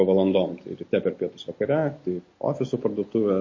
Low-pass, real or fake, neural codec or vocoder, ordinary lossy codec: 7.2 kHz; real; none; MP3, 32 kbps